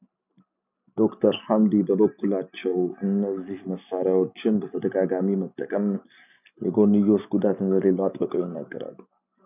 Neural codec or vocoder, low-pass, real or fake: none; 3.6 kHz; real